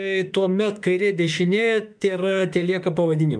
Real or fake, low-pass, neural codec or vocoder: fake; 9.9 kHz; autoencoder, 48 kHz, 32 numbers a frame, DAC-VAE, trained on Japanese speech